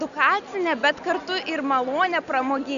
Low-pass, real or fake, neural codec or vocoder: 7.2 kHz; real; none